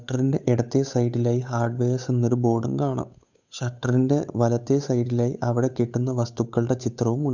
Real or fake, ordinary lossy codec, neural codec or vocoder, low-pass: fake; none; codec, 16 kHz, 8 kbps, FunCodec, trained on Chinese and English, 25 frames a second; 7.2 kHz